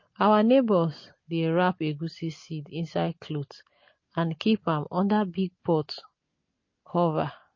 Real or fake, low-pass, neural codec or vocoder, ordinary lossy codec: real; 7.2 kHz; none; MP3, 32 kbps